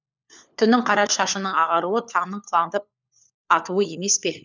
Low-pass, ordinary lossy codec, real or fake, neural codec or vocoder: 7.2 kHz; none; fake; codec, 16 kHz, 4 kbps, FunCodec, trained on LibriTTS, 50 frames a second